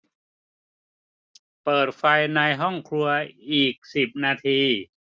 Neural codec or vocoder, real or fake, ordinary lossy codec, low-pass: none; real; none; none